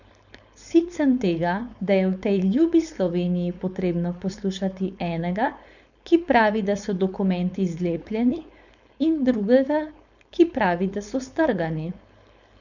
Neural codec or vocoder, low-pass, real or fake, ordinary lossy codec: codec, 16 kHz, 4.8 kbps, FACodec; 7.2 kHz; fake; none